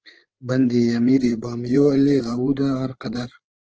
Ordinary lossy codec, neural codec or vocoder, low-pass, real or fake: Opus, 16 kbps; codec, 16 kHz, 8 kbps, FreqCodec, larger model; 7.2 kHz; fake